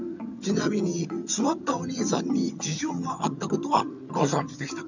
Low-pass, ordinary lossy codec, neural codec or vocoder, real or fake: 7.2 kHz; none; vocoder, 22.05 kHz, 80 mel bands, HiFi-GAN; fake